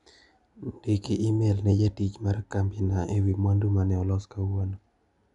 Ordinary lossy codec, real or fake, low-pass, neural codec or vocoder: MP3, 96 kbps; real; 10.8 kHz; none